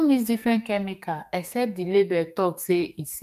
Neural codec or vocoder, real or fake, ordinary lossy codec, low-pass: codec, 44.1 kHz, 2.6 kbps, SNAC; fake; none; 14.4 kHz